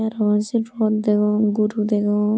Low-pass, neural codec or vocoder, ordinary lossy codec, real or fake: none; none; none; real